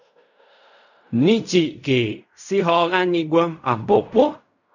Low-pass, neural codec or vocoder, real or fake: 7.2 kHz; codec, 16 kHz in and 24 kHz out, 0.4 kbps, LongCat-Audio-Codec, fine tuned four codebook decoder; fake